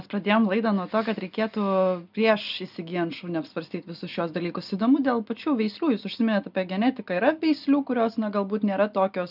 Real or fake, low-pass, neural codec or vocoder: real; 5.4 kHz; none